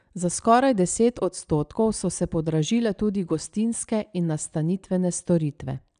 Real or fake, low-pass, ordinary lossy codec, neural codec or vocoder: real; 9.9 kHz; none; none